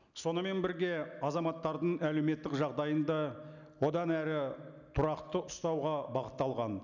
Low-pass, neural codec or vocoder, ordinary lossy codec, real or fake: 7.2 kHz; none; none; real